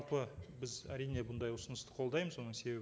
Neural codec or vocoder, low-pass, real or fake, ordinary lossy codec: none; none; real; none